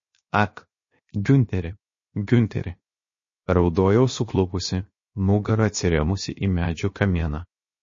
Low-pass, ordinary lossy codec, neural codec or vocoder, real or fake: 7.2 kHz; MP3, 32 kbps; codec, 16 kHz, 0.7 kbps, FocalCodec; fake